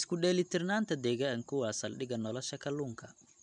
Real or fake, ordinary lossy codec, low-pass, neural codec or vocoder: real; none; 9.9 kHz; none